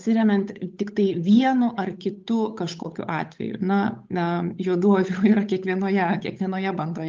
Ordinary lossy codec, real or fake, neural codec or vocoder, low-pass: Opus, 24 kbps; fake; codec, 16 kHz, 8 kbps, FunCodec, trained on Chinese and English, 25 frames a second; 7.2 kHz